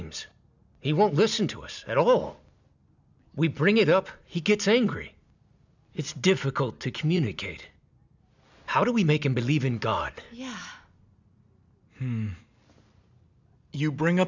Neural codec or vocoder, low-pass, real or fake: none; 7.2 kHz; real